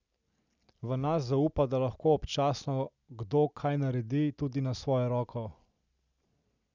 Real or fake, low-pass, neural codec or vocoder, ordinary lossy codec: real; 7.2 kHz; none; none